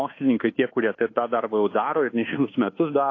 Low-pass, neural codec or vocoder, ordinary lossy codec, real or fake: 7.2 kHz; codec, 24 kHz, 1.2 kbps, DualCodec; AAC, 32 kbps; fake